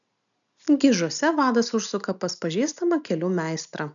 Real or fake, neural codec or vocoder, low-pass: real; none; 7.2 kHz